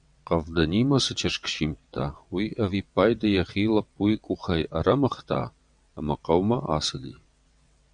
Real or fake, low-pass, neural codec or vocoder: fake; 9.9 kHz; vocoder, 22.05 kHz, 80 mel bands, WaveNeXt